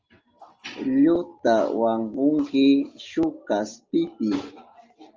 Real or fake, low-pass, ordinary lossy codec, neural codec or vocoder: real; 7.2 kHz; Opus, 24 kbps; none